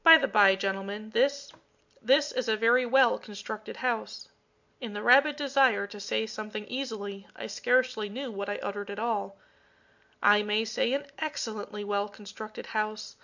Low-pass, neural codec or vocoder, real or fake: 7.2 kHz; none; real